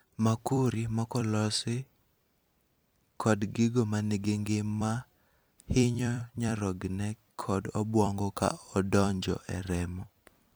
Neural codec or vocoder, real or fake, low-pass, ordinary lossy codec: vocoder, 44.1 kHz, 128 mel bands every 256 samples, BigVGAN v2; fake; none; none